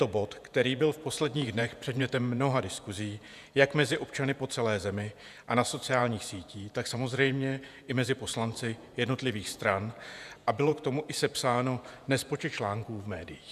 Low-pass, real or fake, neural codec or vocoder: 14.4 kHz; fake; vocoder, 48 kHz, 128 mel bands, Vocos